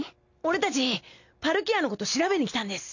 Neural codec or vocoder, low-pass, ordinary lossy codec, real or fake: none; 7.2 kHz; none; real